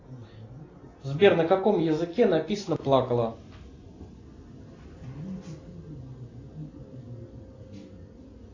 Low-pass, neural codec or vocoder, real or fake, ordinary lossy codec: 7.2 kHz; none; real; AAC, 32 kbps